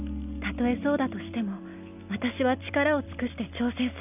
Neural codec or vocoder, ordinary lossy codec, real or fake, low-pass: none; none; real; 3.6 kHz